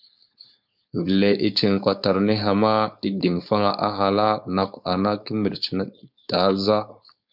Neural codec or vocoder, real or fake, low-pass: codec, 16 kHz, 4.8 kbps, FACodec; fake; 5.4 kHz